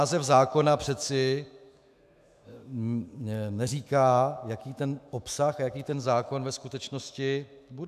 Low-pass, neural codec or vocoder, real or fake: 14.4 kHz; autoencoder, 48 kHz, 128 numbers a frame, DAC-VAE, trained on Japanese speech; fake